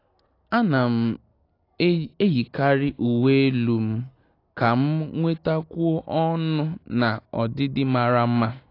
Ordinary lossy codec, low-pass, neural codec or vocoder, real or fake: AAC, 32 kbps; 5.4 kHz; none; real